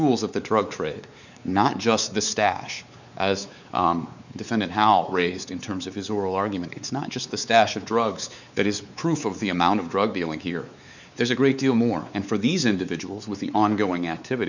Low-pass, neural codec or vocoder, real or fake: 7.2 kHz; codec, 16 kHz, 4 kbps, X-Codec, WavLM features, trained on Multilingual LibriSpeech; fake